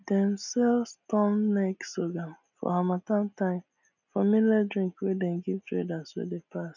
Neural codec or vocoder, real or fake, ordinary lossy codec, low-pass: none; real; none; 7.2 kHz